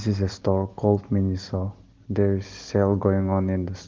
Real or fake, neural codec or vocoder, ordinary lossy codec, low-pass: real; none; Opus, 16 kbps; 7.2 kHz